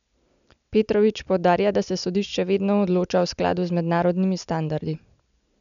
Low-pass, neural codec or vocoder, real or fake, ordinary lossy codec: 7.2 kHz; none; real; none